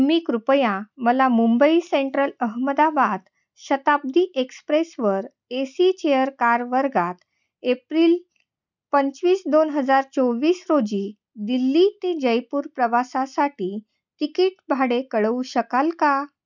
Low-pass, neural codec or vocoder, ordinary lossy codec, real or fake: 7.2 kHz; none; none; real